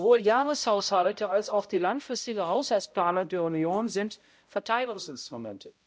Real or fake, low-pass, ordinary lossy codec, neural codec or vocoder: fake; none; none; codec, 16 kHz, 0.5 kbps, X-Codec, HuBERT features, trained on balanced general audio